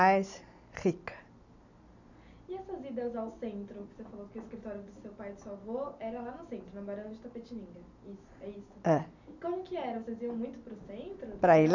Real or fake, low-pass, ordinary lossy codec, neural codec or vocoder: real; 7.2 kHz; none; none